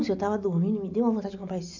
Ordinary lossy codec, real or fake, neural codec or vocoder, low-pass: none; real; none; 7.2 kHz